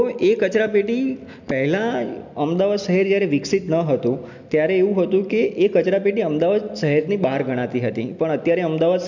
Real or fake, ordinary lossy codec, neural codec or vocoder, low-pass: real; none; none; 7.2 kHz